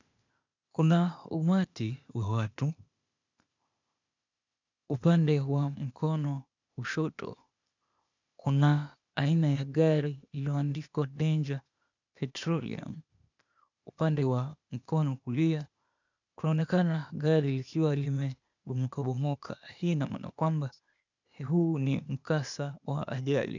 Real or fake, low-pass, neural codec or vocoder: fake; 7.2 kHz; codec, 16 kHz, 0.8 kbps, ZipCodec